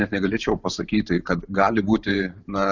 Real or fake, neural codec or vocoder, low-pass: fake; vocoder, 44.1 kHz, 128 mel bands every 512 samples, BigVGAN v2; 7.2 kHz